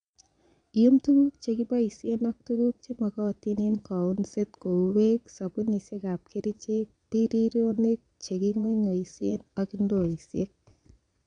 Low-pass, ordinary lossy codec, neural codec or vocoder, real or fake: 9.9 kHz; none; vocoder, 22.05 kHz, 80 mel bands, Vocos; fake